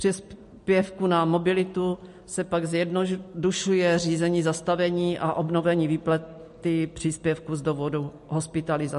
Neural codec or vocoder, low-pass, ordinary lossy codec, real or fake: none; 14.4 kHz; MP3, 48 kbps; real